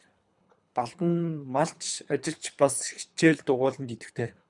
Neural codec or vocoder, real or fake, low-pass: codec, 24 kHz, 3 kbps, HILCodec; fake; 10.8 kHz